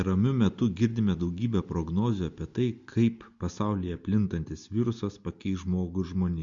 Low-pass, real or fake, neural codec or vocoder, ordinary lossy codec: 7.2 kHz; real; none; Opus, 64 kbps